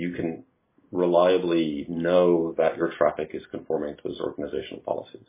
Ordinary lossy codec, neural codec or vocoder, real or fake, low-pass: MP3, 16 kbps; none; real; 3.6 kHz